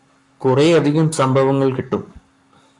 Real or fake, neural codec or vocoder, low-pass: fake; codec, 44.1 kHz, 7.8 kbps, Pupu-Codec; 10.8 kHz